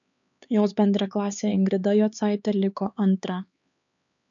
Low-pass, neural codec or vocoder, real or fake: 7.2 kHz; codec, 16 kHz, 4 kbps, X-Codec, HuBERT features, trained on LibriSpeech; fake